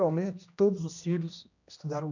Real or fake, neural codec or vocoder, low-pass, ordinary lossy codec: fake; codec, 16 kHz, 1 kbps, X-Codec, HuBERT features, trained on general audio; 7.2 kHz; none